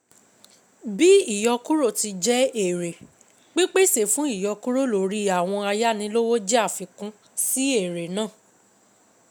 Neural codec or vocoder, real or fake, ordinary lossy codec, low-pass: none; real; none; none